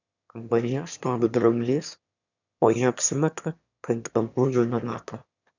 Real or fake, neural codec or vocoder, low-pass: fake; autoencoder, 22.05 kHz, a latent of 192 numbers a frame, VITS, trained on one speaker; 7.2 kHz